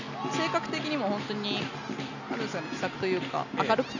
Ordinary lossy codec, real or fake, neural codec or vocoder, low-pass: none; real; none; 7.2 kHz